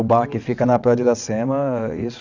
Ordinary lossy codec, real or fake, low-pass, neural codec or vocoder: none; fake; 7.2 kHz; vocoder, 22.05 kHz, 80 mel bands, WaveNeXt